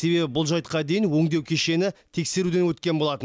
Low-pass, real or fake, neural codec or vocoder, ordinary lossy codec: none; real; none; none